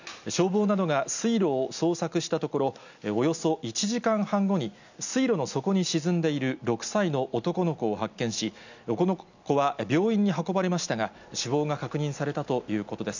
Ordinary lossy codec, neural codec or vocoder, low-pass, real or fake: none; none; 7.2 kHz; real